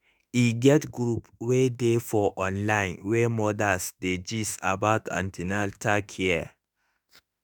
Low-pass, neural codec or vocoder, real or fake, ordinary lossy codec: 19.8 kHz; autoencoder, 48 kHz, 32 numbers a frame, DAC-VAE, trained on Japanese speech; fake; none